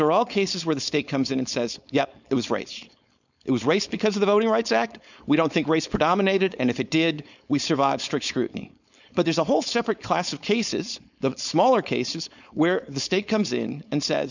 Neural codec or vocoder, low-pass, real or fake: codec, 16 kHz, 4.8 kbps, FACodec; 7.2 kHz; fake